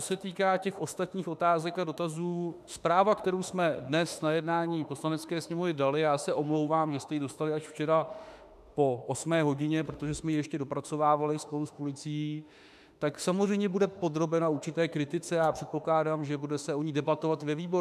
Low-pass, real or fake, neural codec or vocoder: 14.4 kHz; fake; autoencoder, 48 kHz, 32 numbers a frame, DAC-VAE, trained on Japanese speech